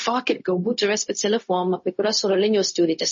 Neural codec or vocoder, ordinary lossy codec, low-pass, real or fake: codec, 16 kHz, 0.4 kbps, LongCat-Audio-Codec; MP3, 32 kbps; 7.2 kHz; fake